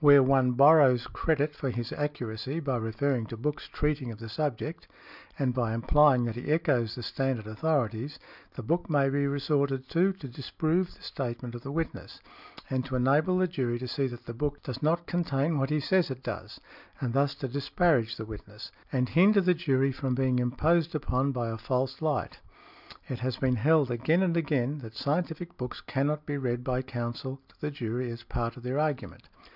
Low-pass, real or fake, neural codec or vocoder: 5.4 kHz; real; none